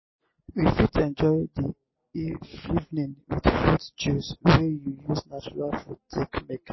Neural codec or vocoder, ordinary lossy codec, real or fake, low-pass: none; MP3, 24 kbps; real; 7.2 kHz